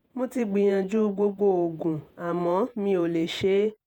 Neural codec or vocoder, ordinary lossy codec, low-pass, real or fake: vocoder, 48 kHz, 128 mel bands, Vocos; none; 19.8 kHz; fake